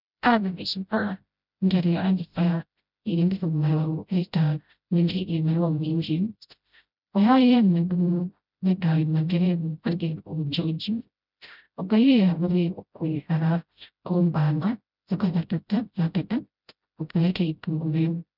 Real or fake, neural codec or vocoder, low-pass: fake; codec, 16 kHz, 0.5 kbps, FreqCodec, smaller model; 5.4 kHz